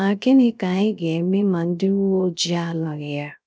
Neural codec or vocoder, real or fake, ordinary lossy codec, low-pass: codec, 16 kHz, 0.3 kbps, FocalCodec; fake; none; none